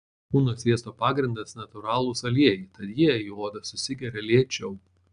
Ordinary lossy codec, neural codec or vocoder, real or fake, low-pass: AAC, 96 kbps; none; real; 9.9 kHz